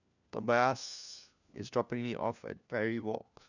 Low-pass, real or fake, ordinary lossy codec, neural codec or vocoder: 7.2 kHz; fake; none; codec, 16 kHz, 1 kbps, FunCodec, trained on LibriTTS, 50 frames a second